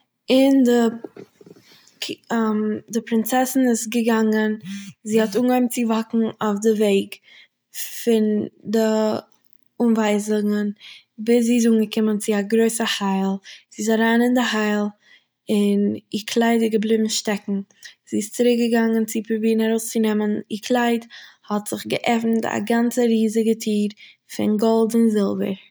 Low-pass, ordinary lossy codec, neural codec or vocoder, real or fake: none; none; none; real